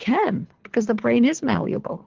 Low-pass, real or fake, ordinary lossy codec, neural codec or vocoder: 7.2 kHz; fake; Opus, 16 kbps; codec, 24 kHz, 3 kbps, HILCodec